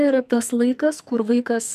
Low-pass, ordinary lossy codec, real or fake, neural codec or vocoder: 14.4 kHz; MP3, 96 kbps; fake; codec, 44.1 kHz, 2.6 kbps, SNAC